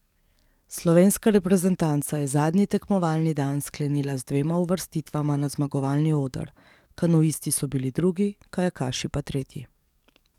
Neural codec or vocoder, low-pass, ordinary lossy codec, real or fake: codec, 44.1 kHz, 7.8 kbps, DAC; 19.8 kHz; none; fake